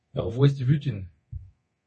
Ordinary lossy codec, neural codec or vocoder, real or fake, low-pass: MP3, 32 kbps; codec, 24 kHz, 0.9 kbps, DualCodec; fake; 10.8 kHz